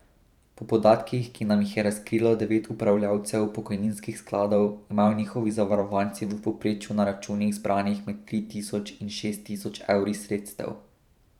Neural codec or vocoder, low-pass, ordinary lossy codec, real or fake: vocoder, 44.1 kHz, 128 mel bands every 512 samples, BigVGAN v2; 19.8 kHz; none; fake